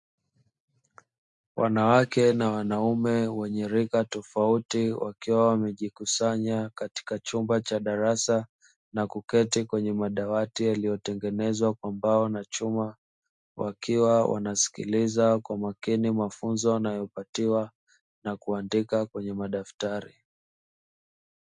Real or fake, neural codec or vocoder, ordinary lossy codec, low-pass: real; none; MP3, 64 kbps; 10.8 kHz